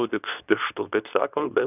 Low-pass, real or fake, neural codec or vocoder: 3.6 kHz; fake; codec, 24 kHz, 0.9 kbps, WavTokenizer, medium speech release version 2